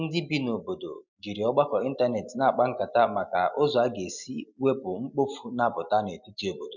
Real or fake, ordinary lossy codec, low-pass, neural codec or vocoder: real; none; 7.2 kHz; none